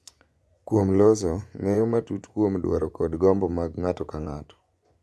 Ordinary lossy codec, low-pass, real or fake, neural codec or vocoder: none; none; fake; vocoder, 24 kHz, 100 mel bands, Vocos